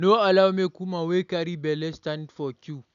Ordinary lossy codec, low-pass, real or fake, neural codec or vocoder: none; 7.2 kHz; real; none